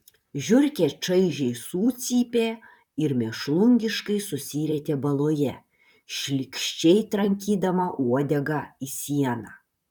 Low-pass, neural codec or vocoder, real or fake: 19.8 kHz; vocoder, 44.1 kHz, 128 mel bands, Pupu-Vocoder; fake